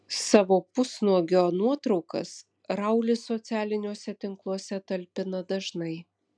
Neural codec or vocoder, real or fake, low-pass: none; real; 9.9 kHz